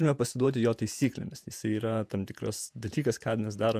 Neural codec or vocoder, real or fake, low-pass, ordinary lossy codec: vocoder, 48 kHz, 128 mel bands, Vocos; fake; 14.4 kHz; MP3, 96 kbps